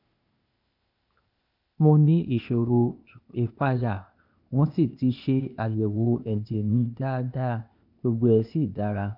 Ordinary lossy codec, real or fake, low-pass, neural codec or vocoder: none; fake; 5.4 kHz; codec, 16 kHz, 0.8 kbps, ZipCodec